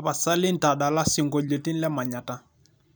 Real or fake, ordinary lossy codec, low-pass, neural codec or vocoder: real; none; none; none